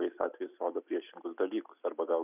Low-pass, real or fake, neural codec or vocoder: 3.6 kHz; real; none